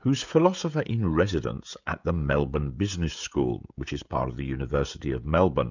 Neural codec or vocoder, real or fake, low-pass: codec, 16 kHz, 16 kbps, FreqCodec, smaller model; fake; 7.2 kHz